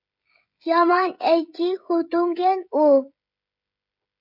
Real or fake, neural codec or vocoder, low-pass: fake; codec, 16 kHz, 16 kbps, FreqCodec, smaller model; 5.4 kHz